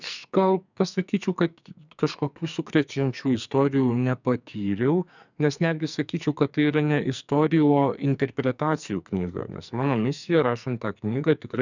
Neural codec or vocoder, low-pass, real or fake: codec, 44.1 kHz, 2.6 kbps, SNAC; 7.2 kHz; fake